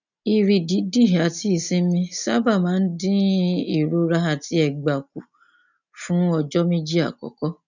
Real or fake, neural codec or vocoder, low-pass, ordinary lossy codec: real; none; 7.2 kHz; none